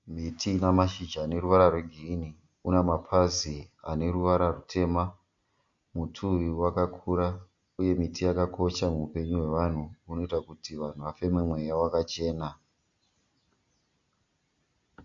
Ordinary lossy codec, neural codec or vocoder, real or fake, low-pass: MP3, 48 kbps; none; real; 7.2 kHz